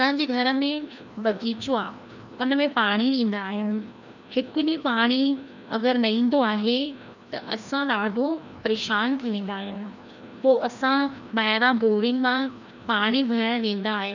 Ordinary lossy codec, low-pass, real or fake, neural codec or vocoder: none; 7.2 kHz; fake; codec, 16 kHz, 1 kbps, FreqCodec, larger model